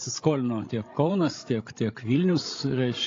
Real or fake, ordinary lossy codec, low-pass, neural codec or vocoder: fake; AAC, 32 kbps; 7.2 kHz; codec, 16 kHz, 16 kbps, FreqCodec, larger model